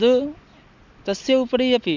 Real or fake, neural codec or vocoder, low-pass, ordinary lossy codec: real; none; 7.2 kHz; Opus, 64 kbps